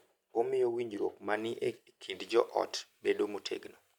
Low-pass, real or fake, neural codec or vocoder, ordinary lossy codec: 19.8 kHz; real; none; none